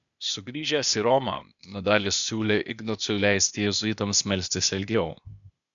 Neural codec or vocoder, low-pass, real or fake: codec, 16 kHz, 0.8 kbps, ZipCodec; 7.2 kHz; fake